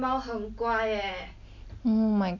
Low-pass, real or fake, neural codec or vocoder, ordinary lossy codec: 7.2 kHz; real; none; none